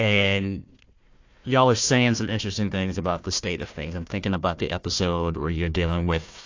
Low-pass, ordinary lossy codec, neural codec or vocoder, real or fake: 7.2 kHz; AAC, 48 kbps; codec, 16 kHz, 1 kbps, FunCodec, trained on Chinese and English, 50 frames a second; fake